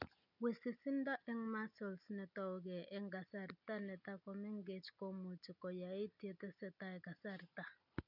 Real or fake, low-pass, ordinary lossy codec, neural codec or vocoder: real; 5.4 kHz; none; none